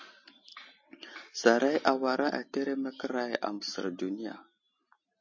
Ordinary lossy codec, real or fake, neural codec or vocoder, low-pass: MP3, 32 kbps; real; none; 7.2 kHz